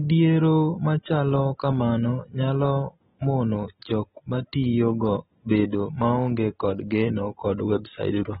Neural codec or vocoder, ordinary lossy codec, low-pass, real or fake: none; AAC, 16 kbps; 10.8 kHz; real